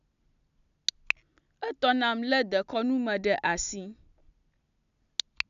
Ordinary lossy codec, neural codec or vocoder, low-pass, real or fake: none; none; 7.2 kHz; real